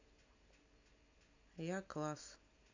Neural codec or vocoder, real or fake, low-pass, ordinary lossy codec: none; real; 7.2 kHz; AAC, 48 kbps